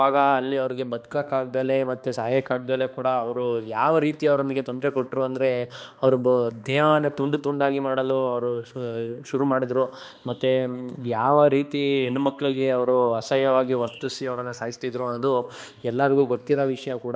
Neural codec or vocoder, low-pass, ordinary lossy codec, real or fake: codec, 16 kHz, 2 kbps, X-Codec, HuBERT features, trained on balanced general audio; none; none; fake